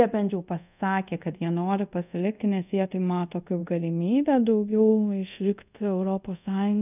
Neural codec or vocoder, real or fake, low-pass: codec, 24 kHz, 0.5 kbps, DualCodec; fake; 3.6 kHz